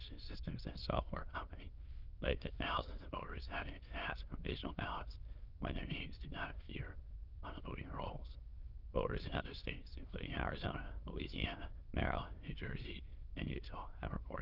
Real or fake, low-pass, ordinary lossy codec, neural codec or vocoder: fake; 5.4 kHz; Opus, 32 kbps; autoencoder, 22.05 kHz, a latent of 192 numbers a frame, VITS, trained on many speakers